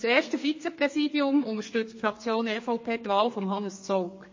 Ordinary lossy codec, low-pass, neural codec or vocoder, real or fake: MP3, 32 kbps; 7.2 kHz; codec, 32 kHz, 1.9 kbps, SNAC; fake